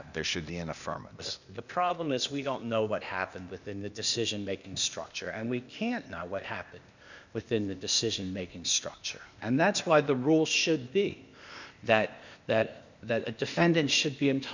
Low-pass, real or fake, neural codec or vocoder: 7.2 kHz; fake; codec, 16 kHz, 0.8 kbps, ZipCodec